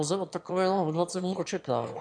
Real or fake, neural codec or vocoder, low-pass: fake; autoencoder, 22.05 kHz, a latent of 192 numbers a frame, VITS, trained on one speaker; 9.9 kHz